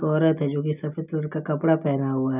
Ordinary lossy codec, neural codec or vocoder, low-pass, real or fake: none; none; 3.6 kHz; real